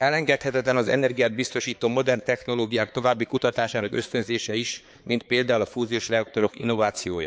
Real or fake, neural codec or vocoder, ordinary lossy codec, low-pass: fake; codec, 16 kHz, 4 kbps, X-Codec, HuBERT features, trained on balanced general audio; none; none